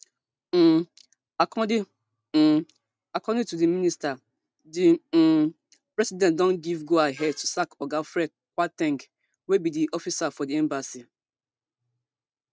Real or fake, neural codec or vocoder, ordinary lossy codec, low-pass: real; none; none; none